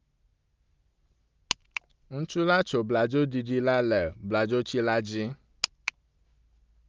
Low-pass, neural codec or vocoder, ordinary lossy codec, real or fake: 7.2 kHz; none; Opus, 32 kbps; real